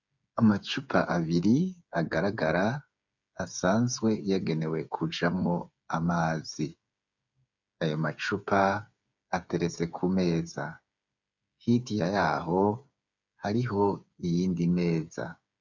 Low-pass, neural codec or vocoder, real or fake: 7.2 kHz; codec, 16 kHz, 8 kbps, FreqCodec, smaller model; fake